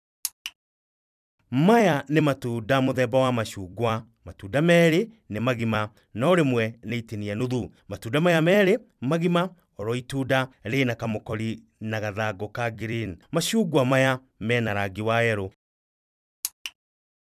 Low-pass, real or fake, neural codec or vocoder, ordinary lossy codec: 14.4 kHz; fake; vocoder, 44.1 kHz, 128 mel bands every 256 samples, BigVGAN v2; none